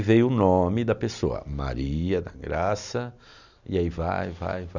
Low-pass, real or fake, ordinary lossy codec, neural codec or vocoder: 7.2 kHz; real; none; none